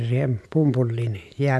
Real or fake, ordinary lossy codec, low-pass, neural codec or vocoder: real; none; none; none